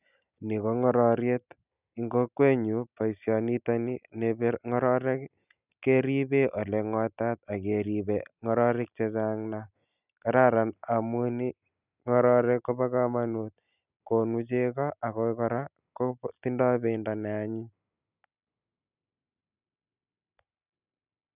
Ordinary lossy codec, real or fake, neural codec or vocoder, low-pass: none; real; none; 3.6 kHz